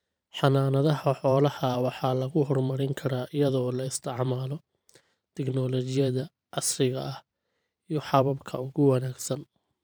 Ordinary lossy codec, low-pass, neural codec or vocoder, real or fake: none; none; vocoder, 44.1 kHz, 128 mel bands every 512 samples, BigVGAN v2; fake